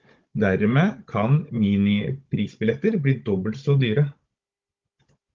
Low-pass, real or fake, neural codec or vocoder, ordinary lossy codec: 7.2 kHz; fake; codec, 16 kHz, 16 kbps, FunCodec, trained on Chinese and English, 50 frames a second; Opus, 32 kbps